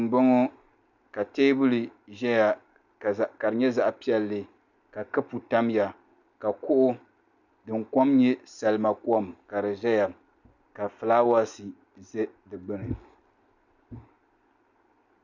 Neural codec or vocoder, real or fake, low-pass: none; real; 7.2 kHz